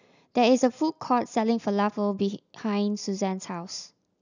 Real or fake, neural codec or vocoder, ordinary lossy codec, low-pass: real; none; none; 7.2 kHz